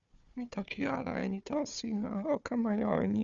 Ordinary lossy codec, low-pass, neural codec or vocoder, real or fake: Opus, 64 kbps; 7.2 kHz; codec, 16 kHz, 4 kbps, FunCodec, trained on Chinese and English, 50 frames a second; fake